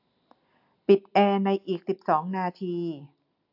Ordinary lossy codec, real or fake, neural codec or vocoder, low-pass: AAC, 48 kbps; real; none; 5.4 kHz